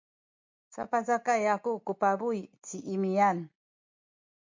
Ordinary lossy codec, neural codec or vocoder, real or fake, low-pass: MP3, 48 kbps; none; real; 7.2 kHz